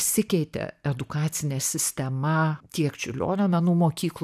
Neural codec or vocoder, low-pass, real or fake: none; 14.4 kHz; real